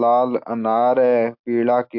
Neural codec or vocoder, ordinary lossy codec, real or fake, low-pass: none; MP3, 48 kbps; real; 5.4 kHz